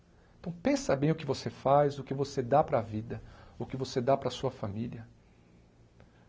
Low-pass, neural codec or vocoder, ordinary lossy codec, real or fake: none; none; none; real